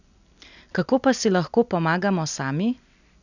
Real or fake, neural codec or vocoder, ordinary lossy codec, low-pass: real; none; none; 7.2 kHz